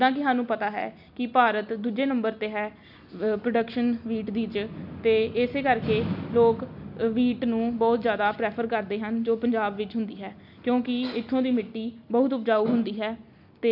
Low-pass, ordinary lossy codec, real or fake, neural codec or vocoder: 5.4 kHz; none; real; none